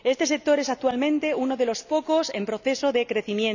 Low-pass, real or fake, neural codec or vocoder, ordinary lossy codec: 7.2 kHz; real; none; none